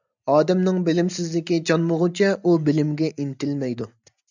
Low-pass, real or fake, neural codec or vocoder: 7.2 kHz; real; none